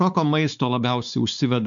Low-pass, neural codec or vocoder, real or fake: 7.2 kHz; codec, 16 kHz, 4 kbps, X-Codec, WavLM features, trained on Multilingual LibriSpeech; fake